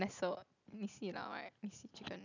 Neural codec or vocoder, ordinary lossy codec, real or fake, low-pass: none; none; real; 7.2 kHz